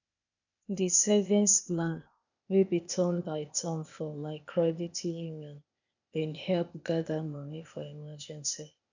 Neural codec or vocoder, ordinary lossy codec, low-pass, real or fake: codec, 16 kHz, 0.8 kbps, ZipCodec; none; 7.2 kHz; fake